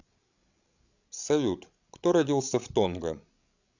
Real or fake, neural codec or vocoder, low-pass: fake; codec, 16 kHz, 16 kbps, FreqCodec, larger model; 7.2 kHz